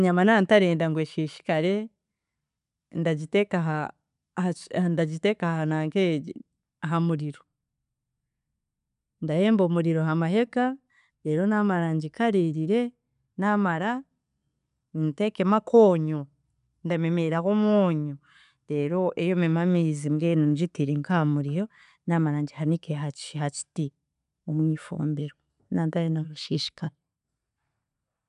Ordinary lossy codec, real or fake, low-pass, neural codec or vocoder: none; real; 10.8 kHz; none